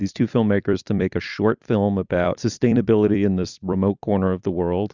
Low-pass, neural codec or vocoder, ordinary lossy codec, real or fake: 7.2 kHz; vocoder, 44.1 kHz, 128 mel bands every 256 samples, BigVGAN v2; Opus, 64 kbps; fake